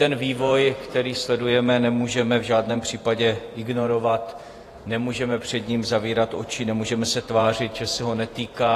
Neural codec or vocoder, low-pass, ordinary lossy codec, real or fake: vocoder, 48 kHz, 128 mel bands, Vocos; 14.4 kHz; AAC, 48 kbps; fake